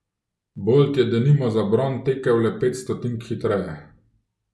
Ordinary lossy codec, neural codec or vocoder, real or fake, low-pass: none; none; real; none